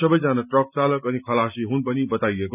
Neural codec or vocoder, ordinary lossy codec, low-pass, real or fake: none; none; 3.6 kHz; real